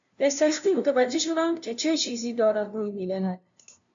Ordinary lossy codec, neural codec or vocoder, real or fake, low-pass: AAC, 64 kbps; codec, 16 kHz, 0.5 kbps, FunCodec, trained on LibriTTS, 25 frames a second; fake; 7.2 kHz